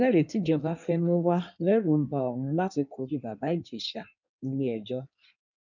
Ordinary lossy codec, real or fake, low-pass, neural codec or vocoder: none; fake; 7.2 kHz; codec, 16 kHz in and 24 kHz out, 1.1 kbps, FireRedTTS-2 codec